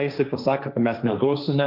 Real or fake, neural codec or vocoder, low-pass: fake; codec, 16 kHz, 1.1 kbps, Voila-Tokenizer; 5.4 kHz